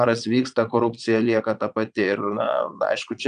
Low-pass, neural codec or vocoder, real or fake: 9.9 kHz; vocoder, 22.05 kHz, 80 mel bands, Vocos; fake